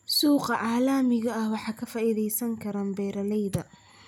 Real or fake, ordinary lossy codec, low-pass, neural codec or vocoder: real; none; 19.8 kHz; none